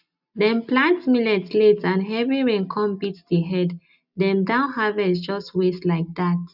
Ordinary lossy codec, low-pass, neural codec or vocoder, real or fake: none; 5.4 kHz; none; real